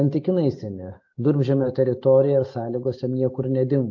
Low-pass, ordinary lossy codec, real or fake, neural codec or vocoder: 7.2 kHz; AAC, 48 kbps; real; none